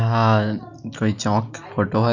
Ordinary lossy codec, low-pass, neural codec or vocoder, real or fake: none; 7.2 kHz; none; real